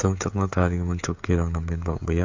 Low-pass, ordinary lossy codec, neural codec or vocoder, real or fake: 7.2 kHz; MP3, 48 kbps; codec, 16 kHz, 16 kbps, FreqCodec, smaller model; fake